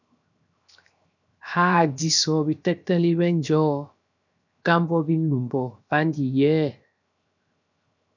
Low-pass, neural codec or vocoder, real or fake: 7.2 kHz; codec, 16 kHz, 0.7 kbps, FocalCodec; fake